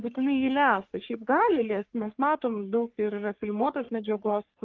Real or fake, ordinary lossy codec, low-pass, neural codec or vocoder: fake; Opus, 32 kbps; 7.2 kHz; codec, 44.1 kHz, 3.4 kbps, Pupu-Codec